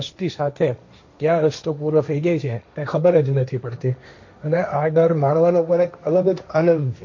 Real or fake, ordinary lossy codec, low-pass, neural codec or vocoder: fake; MP3, 48 kbps; 7.2 kHz; codec, 16 kHz, 1.1 kbps, Voila-Tokenizer